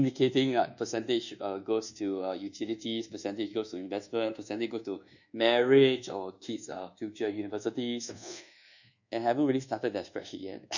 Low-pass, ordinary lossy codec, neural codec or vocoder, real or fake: 7.2 kHz; none; codec, 24 kHz, 1.2 kbps, DualCodec; fake